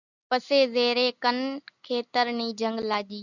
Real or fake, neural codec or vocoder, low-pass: real; none; 7.2 kHz